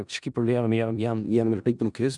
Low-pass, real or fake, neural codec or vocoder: 10.8 kHz; fake; codec, 16 kHz in and 24 kHz out, 0.4 kbps, LongCat-Audio-Codec, four codebook decoder